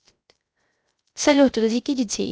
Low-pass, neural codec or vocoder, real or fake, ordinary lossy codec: none; codec, 16 kHz, 0.3 kbps, FocalCodec; fake; none